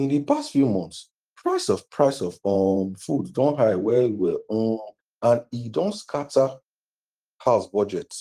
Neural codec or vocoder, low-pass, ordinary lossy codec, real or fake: vocoder, 44.1 kHz, 128 mel bands every 512 samples, BigVGAN v2; 14.4 kHz; Opus, 24 kbps; fake